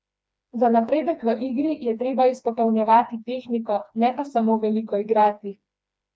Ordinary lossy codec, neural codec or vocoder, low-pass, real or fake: none; codec, 16 kHz, 2 kbps, FreqCodec, smaller model; none; fake